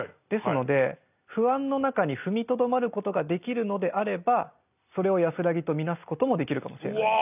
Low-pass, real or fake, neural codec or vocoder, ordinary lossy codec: 3.6 kHz; real; none; none